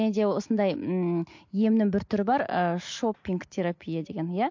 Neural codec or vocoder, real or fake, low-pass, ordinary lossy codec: none; real; 7.2 kHz; MP3, 48 kbps